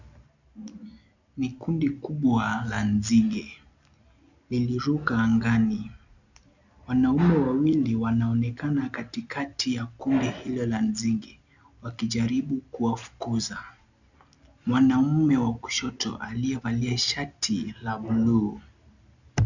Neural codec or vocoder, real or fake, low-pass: none; real; 7.2 kHz